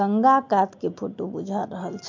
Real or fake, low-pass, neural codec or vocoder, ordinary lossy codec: real; 7.2 kHz; none; MP3, 48 kbps